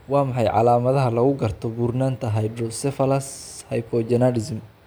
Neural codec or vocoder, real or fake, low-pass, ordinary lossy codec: none; real; none; none